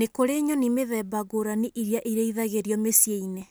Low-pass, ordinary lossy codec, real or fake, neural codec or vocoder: none; none; real; none